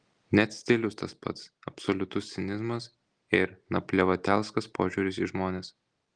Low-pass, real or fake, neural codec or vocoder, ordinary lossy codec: 9.9 kHz; real; none; Opus, 24 kbps